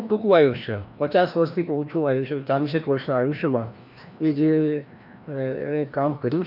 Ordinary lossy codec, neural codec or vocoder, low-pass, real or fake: none; codec, 16 kHz, 1 kbps, FreqCodec, larger model; 5.4 kHz; fake